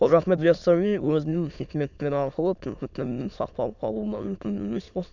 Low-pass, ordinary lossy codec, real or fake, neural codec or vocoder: 7.2 kHz; none; fake; autoencoder, 22.05 kHz, a latent of 192 numbers a frame, VITS, trained on many speakers